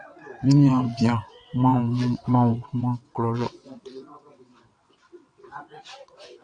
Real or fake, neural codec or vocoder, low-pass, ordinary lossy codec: fake; vocoder, 22.05 kHz, 80 mel bands, WaveNeXt; 9.9 kHz; AAC, 64 kbps